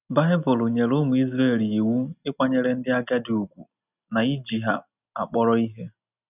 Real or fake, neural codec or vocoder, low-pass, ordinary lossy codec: real; none; 3.6 kHz; none